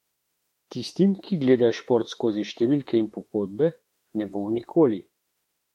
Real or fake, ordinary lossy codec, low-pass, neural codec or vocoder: fake; MP3, 64 kbps; 19.8 kHz; autoencoder, 48 kHz, 32 numbers a frame, DAC-VAE, trained on Japanese speech